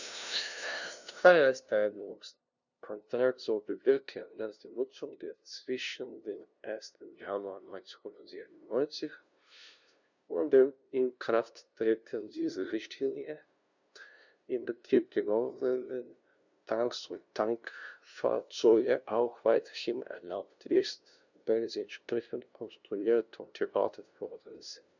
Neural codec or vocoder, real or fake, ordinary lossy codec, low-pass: codec, 16 kHz, 0.5 kbps, FunCodec, trained on LibriTTS, 25 frames a second; fake; none; 7.2 kHz